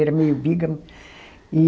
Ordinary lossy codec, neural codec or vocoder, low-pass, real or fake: none; none; none; real